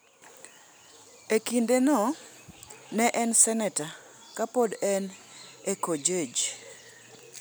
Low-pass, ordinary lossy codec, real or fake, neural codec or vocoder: none; none; real; none